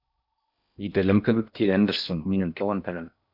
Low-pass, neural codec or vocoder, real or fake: 5.4 kHz; codec, 16 kHz in and 24 kHz out, 0.6 kbps, FocalCodec, streaming, 4096 codes; fake